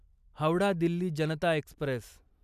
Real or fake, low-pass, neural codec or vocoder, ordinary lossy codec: real; 14.4 kHz; none; none